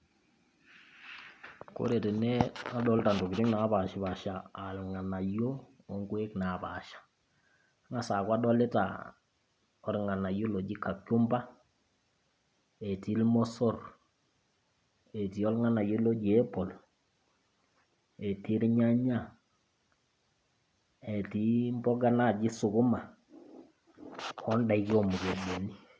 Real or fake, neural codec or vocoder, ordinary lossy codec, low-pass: real; none; none; none